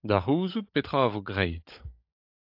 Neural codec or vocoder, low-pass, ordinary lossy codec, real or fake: codec, 16 kHz, 16 kbps, FunCodec, trained on LibriTTS, 50 frames a second; 5.4 kHz; AAC, 48 kbps; fake